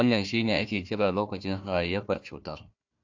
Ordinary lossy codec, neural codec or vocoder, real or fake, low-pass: AAC, 48 kbps; codec, 16 kHz, 1 kbps, FunCodec, trained on Chinese and English, 50 frames a second; fake; 7.2 kHz